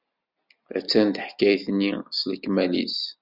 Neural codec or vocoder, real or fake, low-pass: none; real; 5.4 kHz